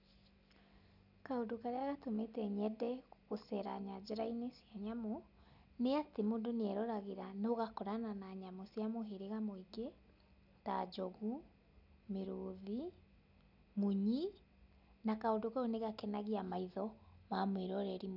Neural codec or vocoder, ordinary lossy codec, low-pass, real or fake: none; none; 5.4 kHz; real